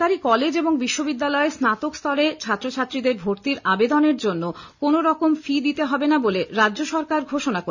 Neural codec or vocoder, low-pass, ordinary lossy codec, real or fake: none; 7.2 kHz; none; real